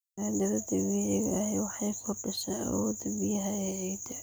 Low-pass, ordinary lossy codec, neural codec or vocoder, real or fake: none; none; none; real